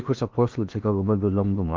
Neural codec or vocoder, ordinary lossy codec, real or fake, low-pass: codec, 16 kHz in and 24 kHz out, 0.8 kbps, FocalCodec, streaming, 65536 codes; Opus, 24 kbps; fake; 7.2 kHz